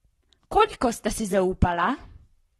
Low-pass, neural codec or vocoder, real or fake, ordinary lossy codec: 19.8 kHz; codec, 44.1 kHz, 7.8 kbps, Pupu-Codec; fake; AAC, 32 kbps